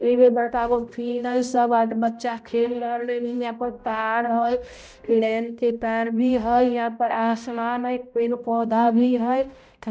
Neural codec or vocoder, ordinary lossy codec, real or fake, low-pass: codec, 16 kHz, 0.5 kbps, X-Codec, HuBERT features, trained on balanced general audio; none; fake; none